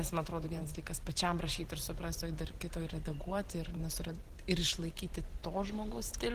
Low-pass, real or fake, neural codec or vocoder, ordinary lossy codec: 14.4 kHz; fake; vocoder, 44.1 kHz, 128 mel bands every 512 samples, BigVGAN v2; Opus, 16 kbps